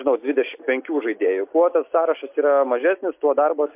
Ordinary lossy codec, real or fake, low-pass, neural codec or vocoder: MP3, 32 kbps; real; 3.6 kHz; none